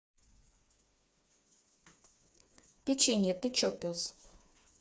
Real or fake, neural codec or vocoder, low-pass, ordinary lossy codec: fake; codec, 16 kHz, 4 kbps, FreqCodec, smaller model; none; none